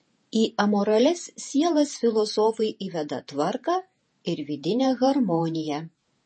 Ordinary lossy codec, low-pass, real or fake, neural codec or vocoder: MP3, 32 kbps; 10.8 kHz; fake; vocoder, 48 kHz, 128 mel bands, Vocos